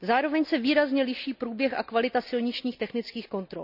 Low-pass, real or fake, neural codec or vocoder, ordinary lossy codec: 5.4 kHz; real; none; none